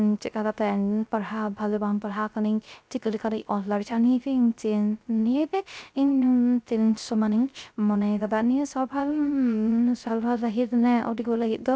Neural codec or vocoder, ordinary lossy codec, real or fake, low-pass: codec, 16 kHz, 0.3 kbps, FocalCodec; none; fake; none